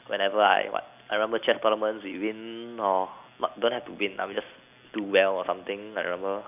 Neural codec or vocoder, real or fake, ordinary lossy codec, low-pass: none; real; none; 3.6 kHz